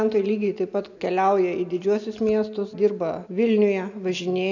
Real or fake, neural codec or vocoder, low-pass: real; none; 7.2 kHz